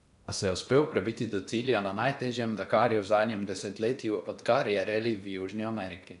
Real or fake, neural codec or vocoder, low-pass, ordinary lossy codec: fake; codec, 16 kHz in and 24 kHz out, 0.8 kbps, FocalCodec, streaming, 65536 codes; 10.8 kHz; none